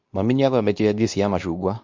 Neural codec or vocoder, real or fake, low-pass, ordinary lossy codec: codec, 24 kHz, 0.9 kbps, WavTokenizer, medium speech release version 2; fake; 7.2 kHz; MP3, 64 kbps